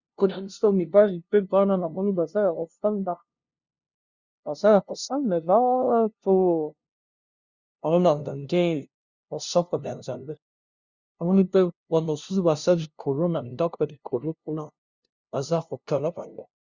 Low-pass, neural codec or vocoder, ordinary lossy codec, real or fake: 7.2 kHz; codec, 16 kHz, 0.5 kbps, FunCodec, trained on LibriTTS, 25 frames a second; Opus, 64 kbps; fake